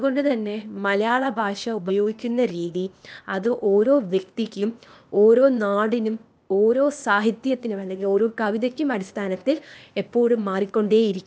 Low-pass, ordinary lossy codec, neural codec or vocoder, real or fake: none; none; codec, 16 kHz, 0.8 kbps, ZipCodec; fake